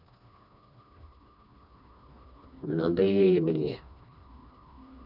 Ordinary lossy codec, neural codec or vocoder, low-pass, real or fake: none; codec, 16 kHz, 2 kbps, FreqCodec, smaller model; 5.4 kHz; fake